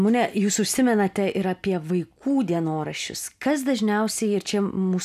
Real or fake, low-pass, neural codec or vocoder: real; 14.4 kHz; none